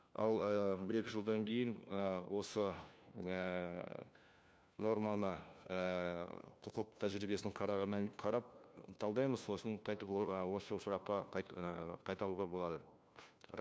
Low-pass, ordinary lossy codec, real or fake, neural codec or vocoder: none; none; fake; codec, 16 kHz, 1 kbps, FunCodec, trained on LibriTTS, 50 frames a second